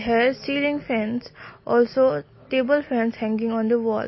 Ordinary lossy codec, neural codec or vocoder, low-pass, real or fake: MP3, 24 kbps; none; 7.2 kHz; real